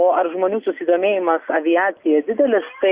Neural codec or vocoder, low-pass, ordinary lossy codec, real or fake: none; 3.6 kHz; Opus, 64 kbps; real